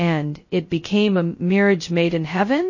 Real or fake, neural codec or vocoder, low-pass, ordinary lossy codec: fake; codec, 16 kHz, 0.2 kbps, FocalCodec; 7.2 kHz; MP3, 32 kbps